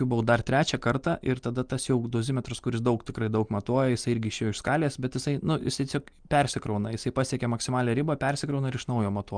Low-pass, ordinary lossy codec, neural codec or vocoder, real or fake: 9.9 kHz; Opus, 32 kbps; none; real